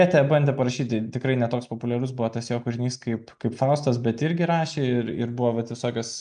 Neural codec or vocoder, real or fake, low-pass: none; real; 9.9 kHz